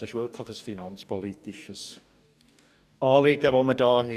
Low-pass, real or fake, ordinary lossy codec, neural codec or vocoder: 14.4 kHz; fake; none; codec, 44.1 kHz, 2.6 kbps, DAC